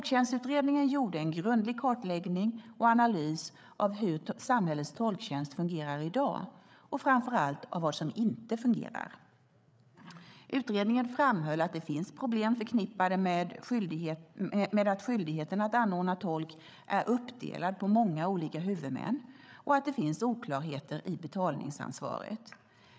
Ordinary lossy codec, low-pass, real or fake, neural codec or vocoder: none; none; fake; codec, 16 kHz, 8 kbps, FreqCodec, larger model